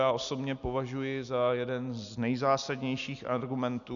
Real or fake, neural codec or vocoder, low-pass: real; none; 7.2 kHz